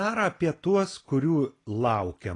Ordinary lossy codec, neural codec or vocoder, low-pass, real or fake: AAC, 32 kbps; none; 10.8 kHz; real